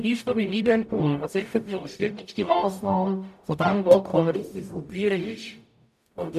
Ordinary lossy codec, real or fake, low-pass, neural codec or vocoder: none; fake; 14.4 kHz; codec, 44.1 kHz, 0.9 kbps, DAC